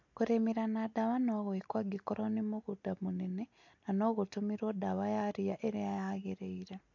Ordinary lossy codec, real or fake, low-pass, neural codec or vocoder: MP3, 48 kbps; real; 7.2 kHz; none